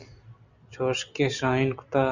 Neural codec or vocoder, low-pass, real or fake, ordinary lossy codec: none; 7.2 kHz; real; Opus, 64 kbps